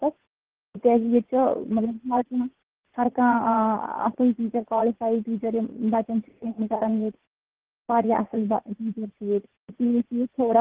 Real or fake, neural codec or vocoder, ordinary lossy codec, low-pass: fake; vocoder, 44.1 kHz, 80 mel bands, Vocos; Opus, 16 kbps; 3.6 kHz